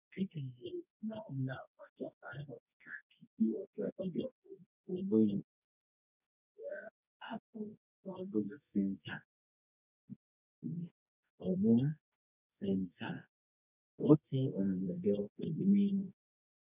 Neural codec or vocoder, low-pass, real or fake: codec, 24 kHz, 0.9 kbps, WavTokenizer, medium music audio release; 3.6 kHz; fake